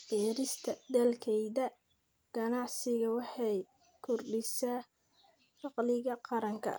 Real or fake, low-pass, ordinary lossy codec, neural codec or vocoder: real; none; none; none